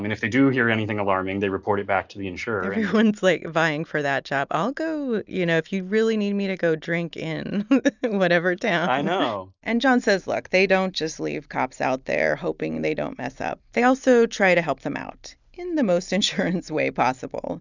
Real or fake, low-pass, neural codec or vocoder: real; 7.2 kHz; none